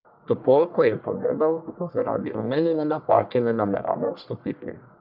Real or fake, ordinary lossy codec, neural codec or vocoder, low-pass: fake; none; codec, 44.1 kHz, 1.7 kbps, Pupu-Codec; 5.4 kHz